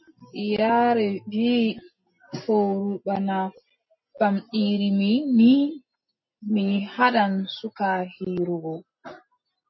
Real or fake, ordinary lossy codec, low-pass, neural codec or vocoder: real; MP3, 24 kbps; 7.2 kHz; none